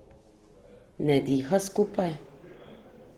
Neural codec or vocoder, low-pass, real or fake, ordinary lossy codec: codec, 44.1 kHz, 7.8 kbps, Pupu-Codec; 19.8 kHz; fake; Opus, 16 kbps